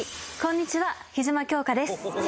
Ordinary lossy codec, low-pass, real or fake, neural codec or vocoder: none; none; real; none